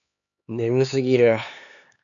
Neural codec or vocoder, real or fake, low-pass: codec, 16 kHz, 4 kbps, X-Codec, HuBERT features, trained on LibriSpeech; fake; 7.2 kHz